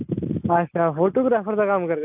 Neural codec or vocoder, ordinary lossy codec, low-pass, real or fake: none; AAC, 32 kbps; 3.6 kHz; real